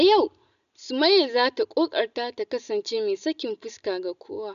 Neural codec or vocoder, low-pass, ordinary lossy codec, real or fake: none; 7.2 kHz; none; real